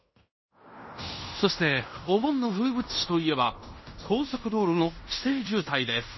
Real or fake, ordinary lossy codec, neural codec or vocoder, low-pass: fake; MP3, 24 kbps; codec, 16 kHz in and 24 kHz out, 0.9 kbps, LongCat-Audio-Codec, fine tuned four codebook decoder; 7.2 kHz